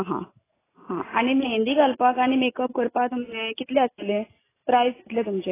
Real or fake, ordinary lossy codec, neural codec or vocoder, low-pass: real; AAC, 16 kbps; none; 3.6 kHz